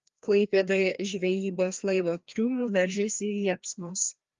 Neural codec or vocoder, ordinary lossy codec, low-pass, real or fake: codec, 16 kHz, 1 kbps, FreqCodec, larger model; Opus, 32 kbps; 7.2 kHz; fake